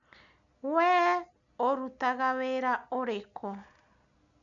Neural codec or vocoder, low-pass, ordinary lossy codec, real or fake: none; 7.2 kHz; none; real